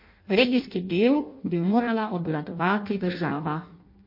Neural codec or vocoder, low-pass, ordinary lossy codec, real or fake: codec, 16 kHz in and 24 kHz out, 0.6 kbps, FireRedTTS-2 codec; 5.4 kHz; MP3, 32 kbps; fake